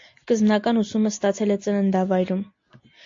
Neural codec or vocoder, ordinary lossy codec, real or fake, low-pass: none; AAC, 48 kbps; real; 7.2 kHz